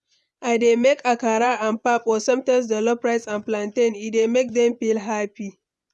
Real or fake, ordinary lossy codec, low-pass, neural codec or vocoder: fake; none; none; vocoder, 24 kHz, 100 mel bands, Vocos